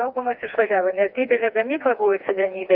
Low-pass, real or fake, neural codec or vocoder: 5.4 kHz; fake; codec, 16 kHz, 2 kbps, FreqCodec, smaller model